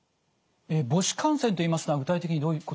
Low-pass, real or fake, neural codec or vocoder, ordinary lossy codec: none; real; none; none